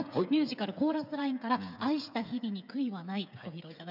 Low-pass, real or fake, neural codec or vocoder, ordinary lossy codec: 5.4 kHz; fake; codec, 16 kHz, 8 kbps, FreqCodec, smaller model; none